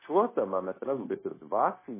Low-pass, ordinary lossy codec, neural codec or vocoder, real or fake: 3.6 kHz; MP3, 16 kbps; codec, 16 kHz, 0.9 kbps, LongCat-Audio-Codec; fake